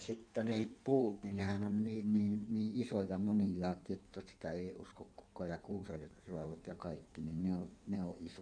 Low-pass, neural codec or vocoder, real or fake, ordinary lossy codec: 9.9 kHz; codec, 16 kHz in and 24 kHz out, 1.1 kbps, FireRedTTS-2 codec; fake; none